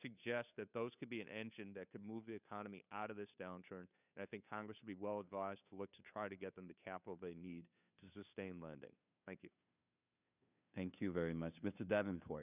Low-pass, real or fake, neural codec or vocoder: 3.6 kHz; fake; codec, 16 kHz, 2 kbps, FunCodec, trained on Chinese and English, 25 frames a second